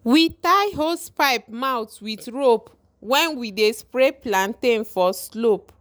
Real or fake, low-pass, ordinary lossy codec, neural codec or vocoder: real; none; none; none